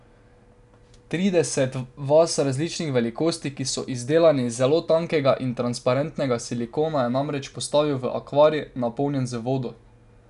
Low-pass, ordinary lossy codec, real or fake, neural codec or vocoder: 10.8 kHz; none; real; none